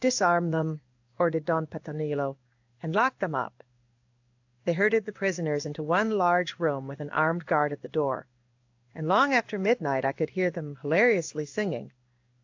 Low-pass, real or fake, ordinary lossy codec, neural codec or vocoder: 7.2 kHz; fake; AAC, 48 kbps; codec, 16 kHz in and 24 kHz out, 1 kbps, XY-Tokenizer